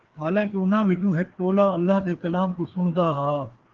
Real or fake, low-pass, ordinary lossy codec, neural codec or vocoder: fake; 7.2 kHz; Opus, 16 kbps; codec, 16 kHz, 2 kbps, FreqCodec, larger model